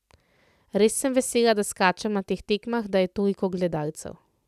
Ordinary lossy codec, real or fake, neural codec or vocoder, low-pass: none; real; none; 14.4 kHz